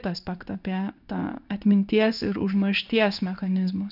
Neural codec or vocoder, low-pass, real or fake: codec, 16 kHz in and 24 kHz out, 1 kbps, XY-Tokenizer; 5.4 kHz; fake